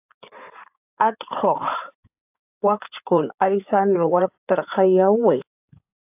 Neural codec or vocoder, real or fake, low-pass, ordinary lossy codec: codec, 16 kHz in and 24 kHz out, 2.2 kbps, FireRedTTS-2 codec; fake; 3.6 kHz; AAC, 32 kbps